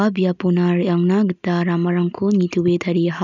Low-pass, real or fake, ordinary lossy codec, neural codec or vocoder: 7.2 kHz; real; none; none